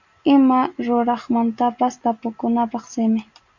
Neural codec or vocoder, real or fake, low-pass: none; real; 7.2 kHz